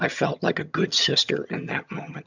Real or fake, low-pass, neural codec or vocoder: fake; 7.2 kHz; vocoder, 22.05 kHz, 80 mel bands, HiFi-GAN